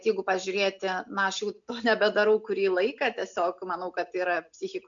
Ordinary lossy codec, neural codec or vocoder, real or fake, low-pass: MP3, 64 kbps; none; real; 7.2 kHz